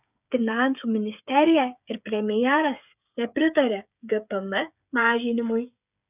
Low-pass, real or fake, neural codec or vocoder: 3.6 kHz; fake; codec, 16 kHz, 8 kbps, FreqCodec, smaller model